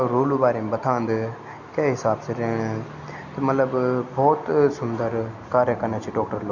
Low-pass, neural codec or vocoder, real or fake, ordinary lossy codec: 7.2 kHz; none; real; none